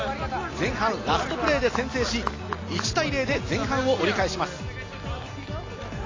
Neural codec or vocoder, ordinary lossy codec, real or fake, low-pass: none; AAC, 32 kbps; real; 7.2 kHz